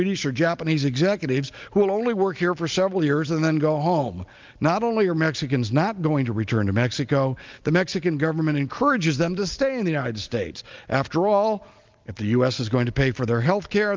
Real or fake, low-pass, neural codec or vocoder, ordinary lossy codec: real; 7.2 kHz; none; Opus, 24 kbps